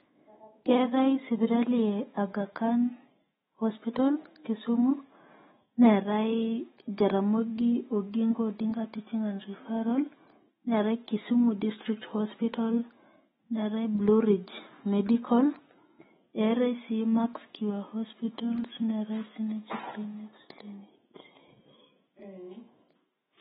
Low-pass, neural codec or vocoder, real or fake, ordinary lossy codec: 19.8 kHz; autoencoder, 48 kHz, 128 numbers a frame, DAC-VAE, trained on Japanese speech; fake; AAC, 16 kbps